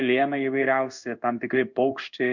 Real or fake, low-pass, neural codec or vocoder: fake; 7.2 kHz; codec, 16 kHz in and 24 kHz out, 1 kbps, XY-Tokenizer